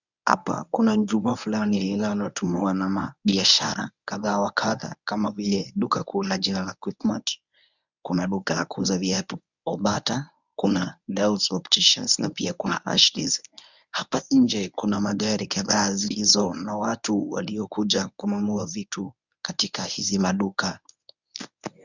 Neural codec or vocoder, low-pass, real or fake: codec, 24 kHz, 0.9 kbps, WavTokenizer, medium speech release version 1; 7.2 kHz; fake